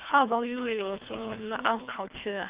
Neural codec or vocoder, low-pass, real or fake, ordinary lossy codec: codec, 24 kHz, 3 kbps, HILCodec; 3.6 kHz; fake; Opus, 24 kbps